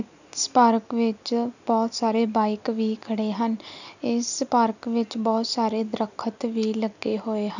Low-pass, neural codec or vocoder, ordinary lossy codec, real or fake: 7.2 kHz; none; none; real